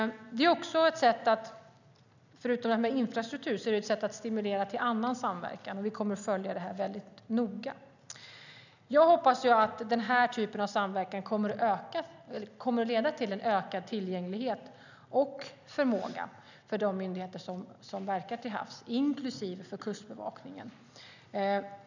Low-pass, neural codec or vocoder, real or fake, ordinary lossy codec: 7.2 kHz; none; real; none